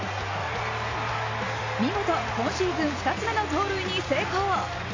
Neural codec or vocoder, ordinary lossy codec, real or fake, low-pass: none; AAC, 32 kbps; real; 7.2 kHz